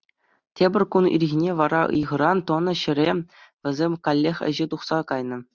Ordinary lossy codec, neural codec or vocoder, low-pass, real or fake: Opus, 64 kbps; none; 7.2 kHz; real